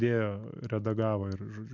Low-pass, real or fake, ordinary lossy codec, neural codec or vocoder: 7.2 kHz; real; MP3, 64 kbps; none